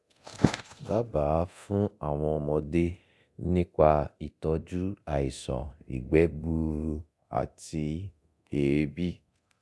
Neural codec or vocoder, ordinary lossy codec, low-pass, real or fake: codec, 24 kHz, 0.9 kbps, DualCodec; none; none; fake